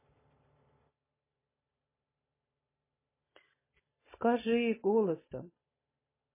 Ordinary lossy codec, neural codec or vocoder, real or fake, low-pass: MP3, 16 kbps; none; real; 3.6 kHz